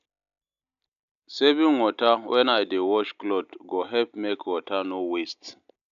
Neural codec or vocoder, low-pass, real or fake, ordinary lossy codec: none; 7.2 kHz; real; AAC, 96 kbps